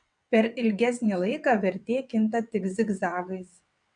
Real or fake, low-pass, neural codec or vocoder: fake; 9.9 kHz; vocoder, 22.05 kHz, 80 mel bands, WaveNeXt